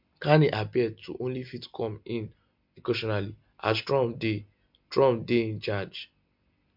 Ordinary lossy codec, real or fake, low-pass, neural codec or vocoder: MP3, 48 kbps; real; 5.4 kHz; none